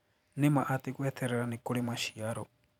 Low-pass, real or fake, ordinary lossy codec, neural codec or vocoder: 19.8 kHz; fake; none; vocoder, 44.1 kHz, 128 mel bands every 512 samples, BigVGAN v2